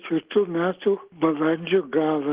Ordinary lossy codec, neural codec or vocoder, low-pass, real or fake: Opus, 16 kbps; none; 3.6 kHz; real